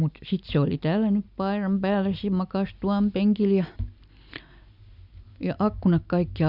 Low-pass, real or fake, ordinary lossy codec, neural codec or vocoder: 5.4 kHz; real; none; none